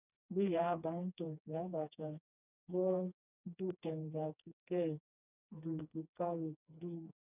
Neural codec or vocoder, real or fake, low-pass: codec, 16 kHz, 2 kbps, FreqCodec, smaller model; fake; 3.6 kHz